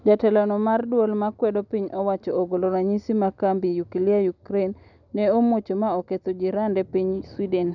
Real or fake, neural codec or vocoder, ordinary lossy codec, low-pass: real; none; none; 7.2 kHz